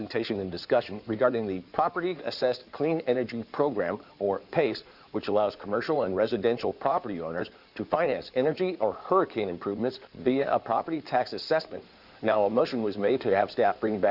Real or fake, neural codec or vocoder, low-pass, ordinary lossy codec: fake; codec, 16 kHz in and 24 kHz out, 2.2 kbps, FireRedTTS-2 codec; 5.4 kHz; Opus, 64 kbps